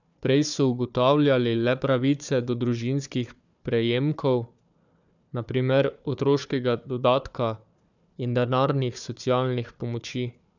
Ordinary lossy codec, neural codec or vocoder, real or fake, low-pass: none; codec, 16 kHz, 4 kbps, FunCodec, trained on Chinese and English, 50 frames a second; fake; 7.2 kHz